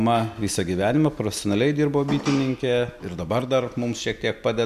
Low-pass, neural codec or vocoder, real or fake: 14.4 kHz; none; real